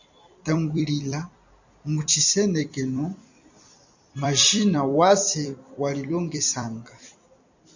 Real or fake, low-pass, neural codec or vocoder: fake; 7.2 kHz; vocoder, 44.1 kHz, 128 mel bands every 256 samples, BigVGAN v2